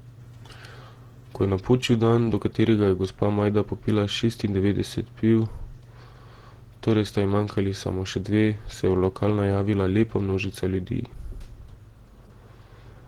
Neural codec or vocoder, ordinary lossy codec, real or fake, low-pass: vocoder, 48 kHz, 128 mel bands, Vocos; Opus, 16 kbps; fake; 19.8 kHz